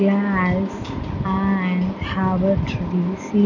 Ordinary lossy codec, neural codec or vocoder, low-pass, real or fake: none; none; 7.2 kHz; real